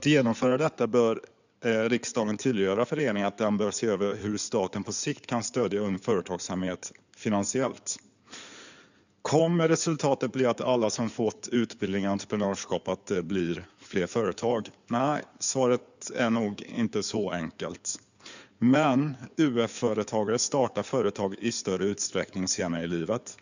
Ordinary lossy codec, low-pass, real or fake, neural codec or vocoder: none; 7.2 kHz; fake; codec, 16 kHz in and 24 kHz out, 2.2 kbps, FireRedTTS-2 codec